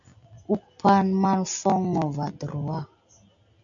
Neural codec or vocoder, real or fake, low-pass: none; real; 7.2 kHz